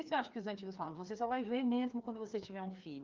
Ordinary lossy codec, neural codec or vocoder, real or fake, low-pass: Opus, 24 kbps; codec, 16 kHz, 2 kbps, FreqCodec, larger model; fake; 7.2 kHz